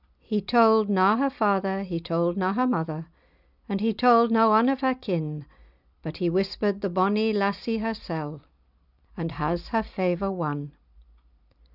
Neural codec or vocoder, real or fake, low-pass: none; real; 5.4 kHz